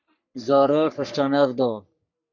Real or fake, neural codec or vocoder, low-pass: fake; codec, 44.1 kHz, 3.4 kbps, Pupu-Codec; 7.2 kHz